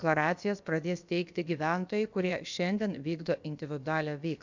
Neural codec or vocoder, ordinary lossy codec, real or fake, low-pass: codec, 16 kHz, about 1 kbps, DyCAST, with the encoder's durations; MP3, 64 kbps; fake; 7.2 kHz